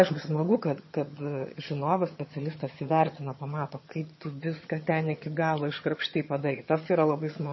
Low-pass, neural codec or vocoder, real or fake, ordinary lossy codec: 7.2 kHz; vocoder, 22.05 kHz, 80 mel bands, HiFi-GAN; fake; MP3, 24 kbps